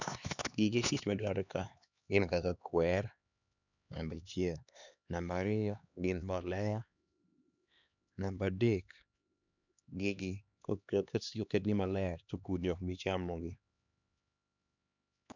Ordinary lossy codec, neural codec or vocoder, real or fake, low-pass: none; codec, 16 kHz, 2 kbps, X-Codec, HuBERT features, trained on LibriSpeech; fake; 7.2 kHz